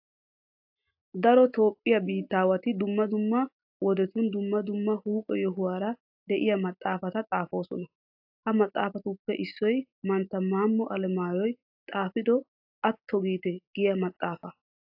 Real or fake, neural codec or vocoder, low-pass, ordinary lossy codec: real; none; 5.4 kHz; AAC, 48 kbps